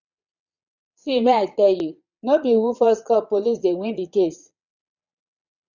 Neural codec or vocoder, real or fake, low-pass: vocoder, 22.05 kHz, 80 mel bands, Vocos; fake; 7.2 kHz